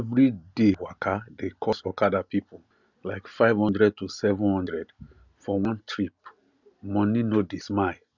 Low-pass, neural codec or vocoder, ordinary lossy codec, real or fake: 7.2 kHz; none; none; real